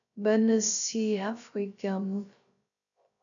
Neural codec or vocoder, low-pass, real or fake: codec, 16 kHz, 0.3 kbps, FocalCodec; 7.2 kHz; fake